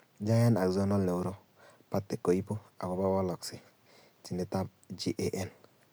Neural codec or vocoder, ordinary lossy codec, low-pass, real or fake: none; none; none; real